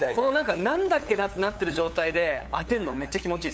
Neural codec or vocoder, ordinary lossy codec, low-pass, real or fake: codec, 16 kHz, 8 kbps, FunCodec, trained on LibriTTS, 25 frames a second; none; none; fake